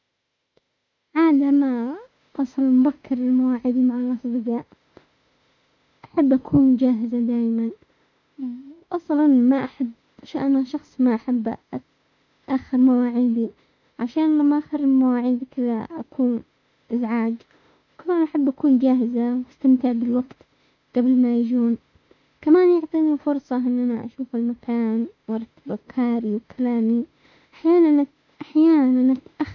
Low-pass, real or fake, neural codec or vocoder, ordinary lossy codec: 7.2 kHz; fake; autoencoder, 48 kHz, 32 numbers a frame, DAC-VAE, trained on Japanese speech; none